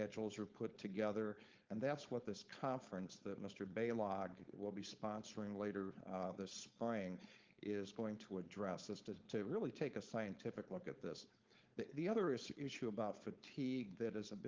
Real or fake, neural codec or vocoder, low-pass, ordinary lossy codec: fake; codec, 16 kHz, 4.8 kbps, FACodec; 7.2 kHz; Opus, 32 kbps